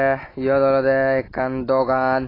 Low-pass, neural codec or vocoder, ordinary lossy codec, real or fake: 5.4 kHz; none; AAC, 24 kbps; real